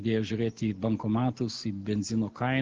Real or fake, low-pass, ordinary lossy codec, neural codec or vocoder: real; 7.2 kHz; Opus, 16 kbps; none